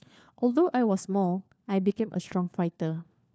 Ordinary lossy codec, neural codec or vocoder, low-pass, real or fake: none; codec, 16 kHz, 4 kbps, FunCodec, trained on LibriTTS, 50 frames a second; none; fake